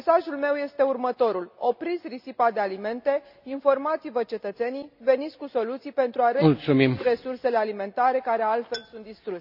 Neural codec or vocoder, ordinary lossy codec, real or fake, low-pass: none; none; real; 5.4 kHz